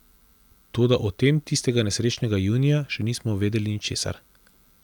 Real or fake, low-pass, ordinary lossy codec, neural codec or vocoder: real; 19.8 kHz; none; none